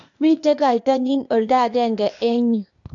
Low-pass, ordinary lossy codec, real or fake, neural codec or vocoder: 7.2 kHz; AAC, 64 kbps; fake; codec, 16 kHz, 0.8 kbps, ZipCodec